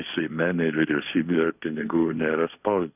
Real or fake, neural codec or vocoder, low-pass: fake; codec, 16 kHz, 1.1 kbps, Voila-Tokenizer; 3.6 kHz